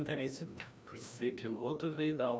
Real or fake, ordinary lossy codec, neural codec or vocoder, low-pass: fake; none; codec, 16 kHz, 1 kbps, FreqCodec, larger model; none